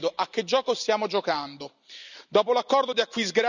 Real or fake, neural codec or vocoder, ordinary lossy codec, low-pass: real; none; none; 7.2 kHz